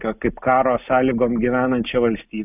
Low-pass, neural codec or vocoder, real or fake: 3.6 kHz; none; real